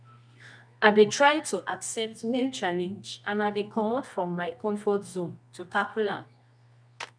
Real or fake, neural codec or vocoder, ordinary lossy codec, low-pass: fake; codec, 24 kHz, 0.9 kbps, WavTokenizer, medium music audio release; none; 9.9 kHz